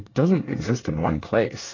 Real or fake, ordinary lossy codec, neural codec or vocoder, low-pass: fake; AAC, 32 kbps; codec, 24 kHz, 1 kbps, SNAC; 7.2 kHz